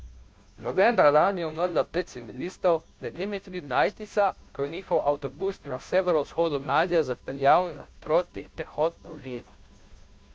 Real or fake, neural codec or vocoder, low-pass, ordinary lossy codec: fake; codec, 16 kHz, 0.5 kbps, FunCodec, trained on Chinese and English, 25 frames a second; none; none